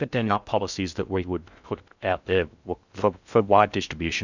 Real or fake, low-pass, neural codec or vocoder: fake; 7.2 kHz; codec, 16 kHz in and 24 kHz out, 0.6 kbps, FocalCodec, streaming, 2048 codes